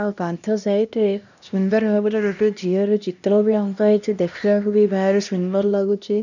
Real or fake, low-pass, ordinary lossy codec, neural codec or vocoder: fake; 7.2 kHz; none; codec, 16 kHz, 1 kbps, X-Codec, HuBERT features, trained on LibriSpeech